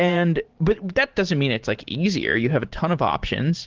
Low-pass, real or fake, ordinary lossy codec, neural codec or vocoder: 7.2 kHz; fake; Opus, 16 kbps; vocoder, 44.1 kHz, 128 mel bands every 512 samples, BigVGAN v2